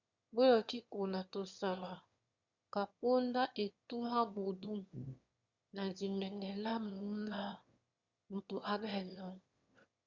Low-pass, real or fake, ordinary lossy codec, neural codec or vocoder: 7.2 kHz; fake; Opus, 64 kbps; autoencoder, 22.05 kHz, a latent of 192 numbers a frame, VITS, trained on one speaker